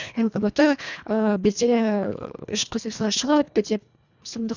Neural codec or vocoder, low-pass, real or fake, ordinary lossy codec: codec, 24 kHz, 1.5 kbps, HILCodec; 7.2 kHz; fake; none